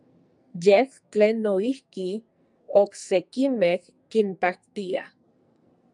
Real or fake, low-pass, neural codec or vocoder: fake; 10.8 kHz; codec, 44.1 kHz, 2.6 kbps, SNAC